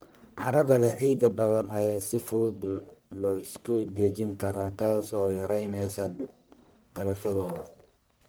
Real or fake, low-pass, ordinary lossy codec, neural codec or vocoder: fake; none; none; codec, 44.1 kHz, 1.7 kbps, Pupu-Codec